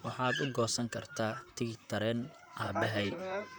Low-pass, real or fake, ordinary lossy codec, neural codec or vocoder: none; fake; none; vocoder, 44.1 kHz, 128 mel bands, Pupu-Vocoder